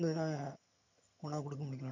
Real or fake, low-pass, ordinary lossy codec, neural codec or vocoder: fake; 7.2 kHz; none; vocoder, 22.05 kHz, 80 mel bands, HiFi-GAN